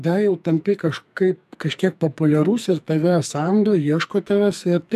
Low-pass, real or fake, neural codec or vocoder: 14.4 kHz; fake; codec, 44.1 kHz, 2.6 kbps, SNAC